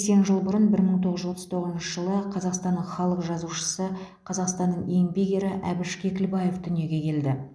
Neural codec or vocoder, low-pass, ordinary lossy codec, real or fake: none; none; none; real